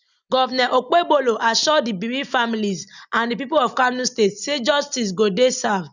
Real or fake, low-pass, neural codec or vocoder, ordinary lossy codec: real; 7.2 kHz; none; none